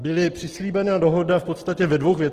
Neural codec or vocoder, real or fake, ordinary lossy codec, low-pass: none; real; Opus, 16 kbps; 14.4 kHz